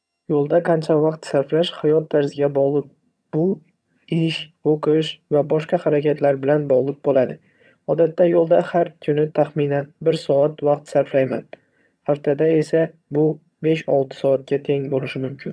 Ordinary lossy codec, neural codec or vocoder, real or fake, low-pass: none; vocoder, 22.05 kHz, 80 mel bands, HiFi-GAN; fake; none